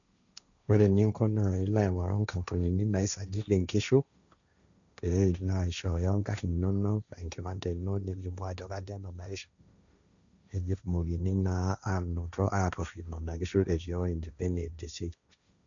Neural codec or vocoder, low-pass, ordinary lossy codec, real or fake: codec, 16 kHz, 1.1 kbps, Voila-Tokenizer; 7.2 kHz; none; fake